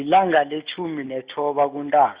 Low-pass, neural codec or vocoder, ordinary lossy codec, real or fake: 3.6 kHz; none; Opus, 64 kbps; real